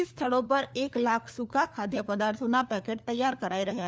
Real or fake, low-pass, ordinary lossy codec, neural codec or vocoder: fake; none; none; codec, 16 kHz, 4 kbps, FunCodec, trained on LibriTTS, 50 frames a second